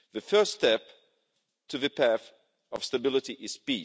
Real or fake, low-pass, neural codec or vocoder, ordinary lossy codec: real; none; none; none